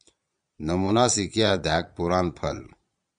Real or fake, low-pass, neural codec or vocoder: fake; 9.9 kHz; vocoder, 22.05 kHz, 80 mel bands, Vocos